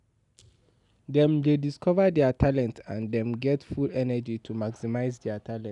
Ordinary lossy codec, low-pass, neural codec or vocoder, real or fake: none; 10.8 kHz; none; real